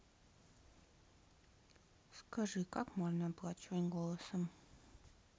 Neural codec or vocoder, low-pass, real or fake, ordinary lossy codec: none; none; real; none